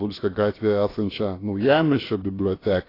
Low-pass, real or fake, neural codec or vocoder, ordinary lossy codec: 5.4 kHz; fake; codec, 16 kHz, about 1 kbps, DyCAST, with the encoder's durations; AAC, 24 kbps